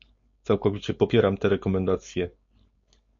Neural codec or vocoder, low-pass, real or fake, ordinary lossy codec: codec, 16 kHz, 4.8 kbps, FACodec; 7.2 kHz; fake; MP3, 48 kbps